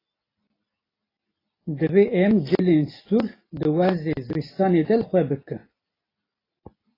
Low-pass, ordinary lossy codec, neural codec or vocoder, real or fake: 5.4 kHz; AAC, 24 kbps; none; real